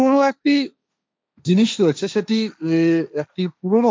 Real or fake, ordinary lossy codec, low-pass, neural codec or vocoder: fake; none; none; codec, 16 kHz, 1.1 kbps, Voila-Tokenizer